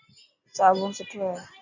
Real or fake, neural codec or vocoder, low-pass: real; none; 7.2 kHz